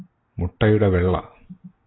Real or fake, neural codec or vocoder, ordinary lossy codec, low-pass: real; none; AAC, 16 kbps; 7.2 kHz